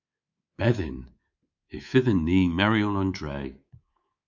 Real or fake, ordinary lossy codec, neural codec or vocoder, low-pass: fake; Opus, 64 kbps; codec, 24 kHz, 3.1 kbps, DualCodec; 7.2 kHz